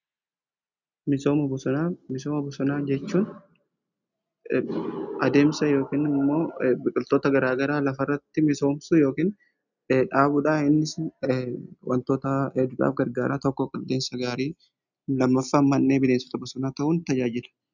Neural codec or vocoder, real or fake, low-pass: none; real; 7.2 kHz